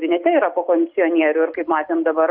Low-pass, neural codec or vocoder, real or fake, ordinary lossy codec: 5.4 kHz; none; real; Opus, 64 kbps